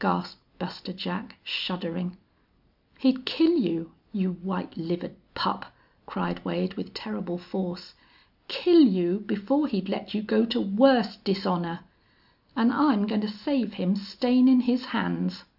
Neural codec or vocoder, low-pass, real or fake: none; 5.4 kHz; real